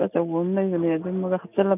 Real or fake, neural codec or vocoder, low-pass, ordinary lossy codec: real; none; 3.6 kHz; none